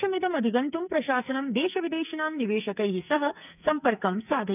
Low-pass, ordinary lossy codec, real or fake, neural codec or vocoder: 3.6 kHz; none; fake; codec, 44.1 kHz, 2.6 kbps, SNAC